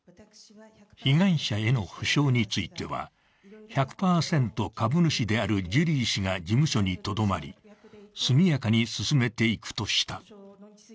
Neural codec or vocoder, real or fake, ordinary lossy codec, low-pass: none; real; none; none